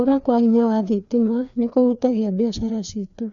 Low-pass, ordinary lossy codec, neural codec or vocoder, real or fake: 7.2 kHz; none; codec, 16 kHz, 2 kbps, FreqCodec, larger model; fake